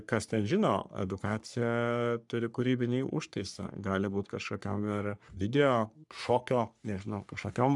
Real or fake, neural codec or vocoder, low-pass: fake; codec, 44.1 kHz, 3.4 kbps, Pupu-Codec; 10.8 kHz